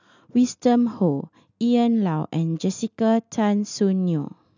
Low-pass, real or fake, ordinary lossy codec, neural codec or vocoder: 7.2 kHz; real; none; none